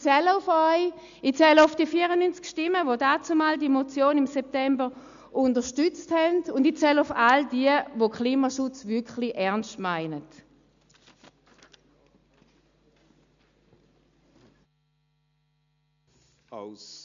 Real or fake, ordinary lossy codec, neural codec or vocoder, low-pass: real; MP3, 64 kbps; none; 7.2 kHz